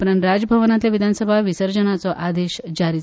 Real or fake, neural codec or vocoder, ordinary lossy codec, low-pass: real; none; none; none